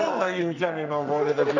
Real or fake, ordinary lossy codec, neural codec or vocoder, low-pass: fake; none; codec, 44.1 kHz, 2.6 kbps, SNAC; 7.2 kHz